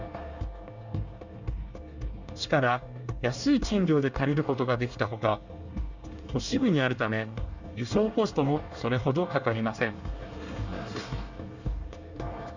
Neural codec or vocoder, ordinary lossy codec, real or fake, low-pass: codec, 24 kHz, 1 kbps, SNAC; Opus, 64 kbps; fake; 7.2 kHz